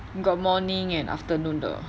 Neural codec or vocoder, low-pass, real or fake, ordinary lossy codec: none; none; real; none